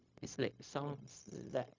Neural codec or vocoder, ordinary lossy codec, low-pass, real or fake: codec, 16 kHz, 0.4 kbps, LongCat-Audio-Codec; MP3, 64 kbps; 7.2 kHz; fake